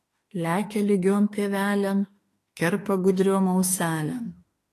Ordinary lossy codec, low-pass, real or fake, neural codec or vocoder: AAC, 48 kbps; 14.4 kHz; fake; autoencoder, 48 kHz, 32 numbers a frame, DAC-VAE, trained on Japanese speech